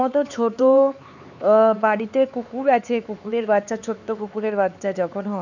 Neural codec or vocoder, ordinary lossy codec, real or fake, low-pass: codec, 16 kHz, 4 kbps, X-Codec, HuBERT features, trained on LibriSpeech; none; fake; 7.2 kHz